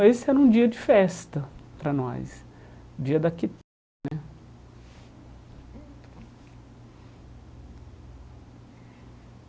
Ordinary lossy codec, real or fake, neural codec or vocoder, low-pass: none; real; none; none